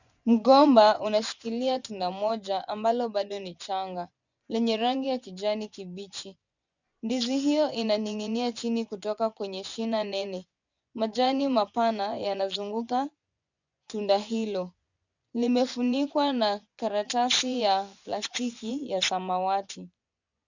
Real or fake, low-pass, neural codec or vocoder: fake; 7.2 kHz; vocoder, 24 kHz, 100 mel bands, Vocos